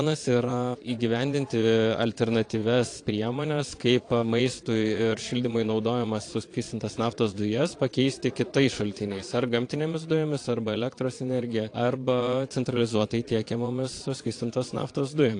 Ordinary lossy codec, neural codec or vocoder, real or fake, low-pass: AAC, 48 kbps; vocoder, 22.05 kHz, 80 mel bands, Vocos; fake; 9.9 kHz